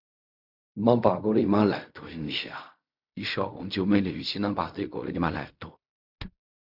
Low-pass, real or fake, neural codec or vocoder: 5.4 kHz; fake; codec, 16 kHz in and 24 kHz out, 0.4 kbps, LongCat-Audio-Codec, fine tuned four codebook decoder